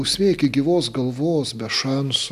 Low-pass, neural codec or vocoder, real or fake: 14.4 kHz; none; real